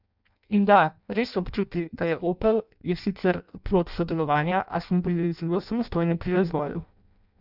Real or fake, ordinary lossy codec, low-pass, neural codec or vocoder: fake; none; 5.4 kHz; codec, 16 kHz in and 24 kHz out, 0.6 kbps, FireRedTTS-2 codec